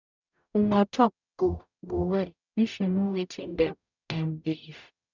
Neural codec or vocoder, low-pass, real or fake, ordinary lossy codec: codec, 44.1 kHz, 0.9 kbps, DAC; 7.2 kHz; fake; none